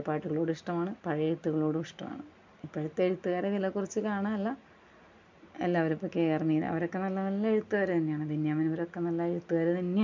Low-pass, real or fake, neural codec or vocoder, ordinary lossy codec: 7.2 kHz; real; none; MP3, 64 kbps